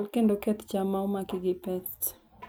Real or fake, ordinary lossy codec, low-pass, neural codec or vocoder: real; none; none; none